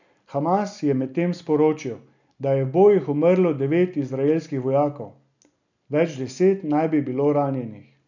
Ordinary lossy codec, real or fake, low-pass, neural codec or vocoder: none; real; 7.2 kHz; none